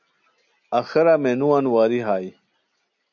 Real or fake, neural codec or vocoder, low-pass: real; none; 7.2 kHz